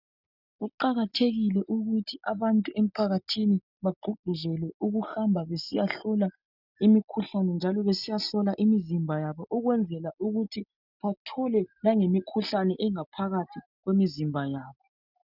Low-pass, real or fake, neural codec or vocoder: 5.4 kHz; real; none